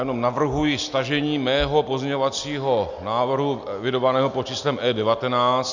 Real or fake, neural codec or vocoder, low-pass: real; none; 7.2 kHz